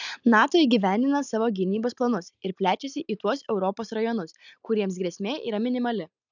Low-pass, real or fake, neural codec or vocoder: 7.2 kHz; real; none